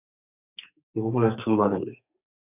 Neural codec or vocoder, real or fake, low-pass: codec, 32 kHz, 1.9 kbps, SNAC; fake; 3.6 kHz